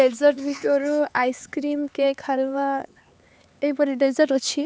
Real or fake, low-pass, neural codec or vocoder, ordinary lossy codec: fake; none; codec, 16 kHz, 4 kbps, X-Codec, HuBERT features, trained on LibriSpeech; none